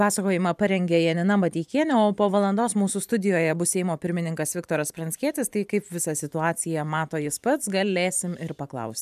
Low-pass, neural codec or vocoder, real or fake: 14.4 kHz; vocoder, 44.1 kHz, 128 mel bands every 512 samples, BigVGAN v2; fake